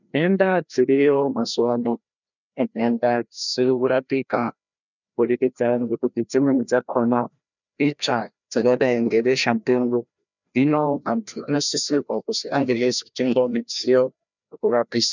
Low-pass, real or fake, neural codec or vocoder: 7.2 kHz; fake; codec, 16 kHz, 1 kbps, FreqCodec, larger model